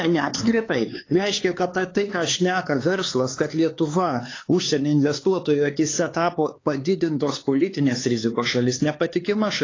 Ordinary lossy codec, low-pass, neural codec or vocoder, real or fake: AAC, 32 kbps; 7.2 kHz; codec, 16 kHz, 4 kbps, X-Codec, HuBERT features, trained on LibriSpeech; fake